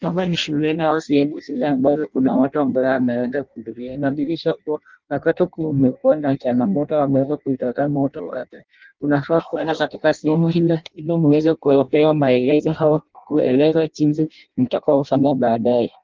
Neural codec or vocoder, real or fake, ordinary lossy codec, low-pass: codec, 16 kHz in and 24 kHz out, 0.6 kbps, FireRedTTS-2 codec; fake; Opus, 24 kbps; 7.2 kHz